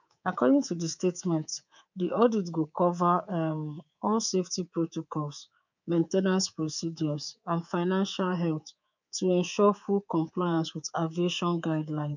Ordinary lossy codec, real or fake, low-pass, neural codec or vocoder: none; fake; 7.2 kHz; codec, 24 kHz, 3.1 kbps, DualCodec